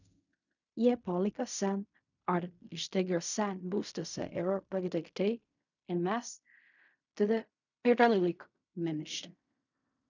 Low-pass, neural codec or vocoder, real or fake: 7.2 kHz; codec, 16 kHz in and 24 kHz out, 0.4 kbps, LongCat-Audio-Codec, fine tuned four codebook decoder; fake